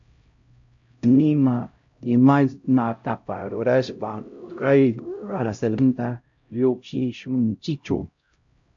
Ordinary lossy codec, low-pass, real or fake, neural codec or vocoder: MP3, 48 kbps; 7.2 kHz; fake; codec, 16 kHz, 0.5 kbps, X-Codec, HuBERT features, trained on LibriSpeech